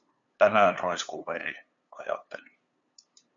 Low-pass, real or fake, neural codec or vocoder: 7.2 kHz; fake; codec, 16 kHz, 2 kbps, FunCodec, trained on LibriTTS, 25 frames a second